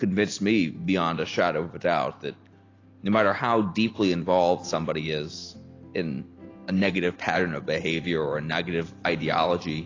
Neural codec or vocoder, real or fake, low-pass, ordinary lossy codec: none; real; 7.2 kHz; AAC, 32 kbps